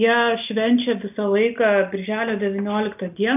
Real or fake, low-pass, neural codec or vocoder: real; 3.6 kHz; none